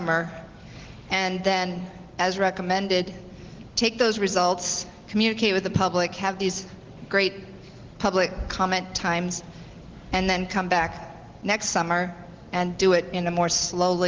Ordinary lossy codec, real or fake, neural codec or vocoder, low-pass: Opus, 16 kbps; real; none; 7.2 kHz